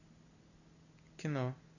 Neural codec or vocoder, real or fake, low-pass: none; real; 7.2 kHz